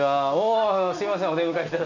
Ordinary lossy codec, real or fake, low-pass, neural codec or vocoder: none; real; 7.2 kHz; none